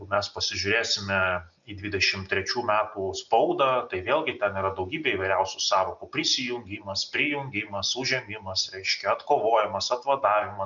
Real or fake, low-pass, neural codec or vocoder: real; 7.2 kHz; none